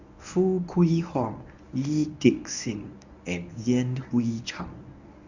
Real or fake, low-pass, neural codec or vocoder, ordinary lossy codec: fake; 7.2 kHz; codec, 24 kHz, 0.9 kbps, WavTokenizer, medium speech release version 1; none